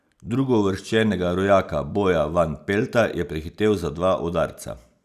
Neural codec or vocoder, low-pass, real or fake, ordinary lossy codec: none; 14.4 kHz; real; none